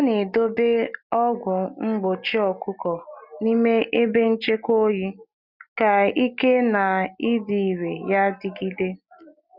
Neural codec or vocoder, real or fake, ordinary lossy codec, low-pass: none; real; none; 5.4 kHz